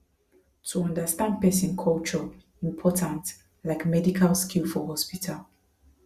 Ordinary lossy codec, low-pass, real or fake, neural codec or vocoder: Opus, 64 kbps; 14.4 kHz; real; none